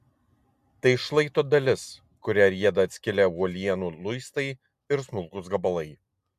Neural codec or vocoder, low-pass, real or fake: none; 14.4 kHz; real